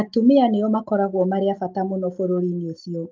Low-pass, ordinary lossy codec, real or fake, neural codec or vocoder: 7.2 kHz; Opus, 32 kbps; real; none